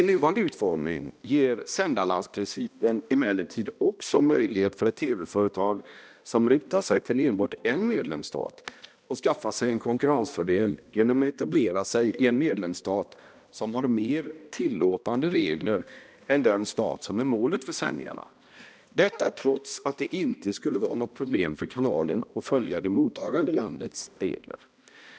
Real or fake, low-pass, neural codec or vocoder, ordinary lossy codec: fake; none; codec, 16 kHz, 1 kbps, X-Codec, HuBERT features, trained on balanced general audio; none